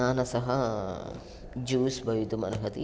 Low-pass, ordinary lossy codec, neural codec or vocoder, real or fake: none; none; none; real